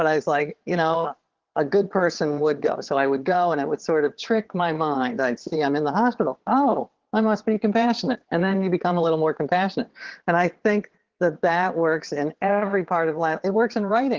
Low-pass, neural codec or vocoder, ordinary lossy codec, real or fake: 7.2 kHz; vocoder, 22.05 kHz, 80 mel bands, WaveNeXt; Opus, 16 kbps; fake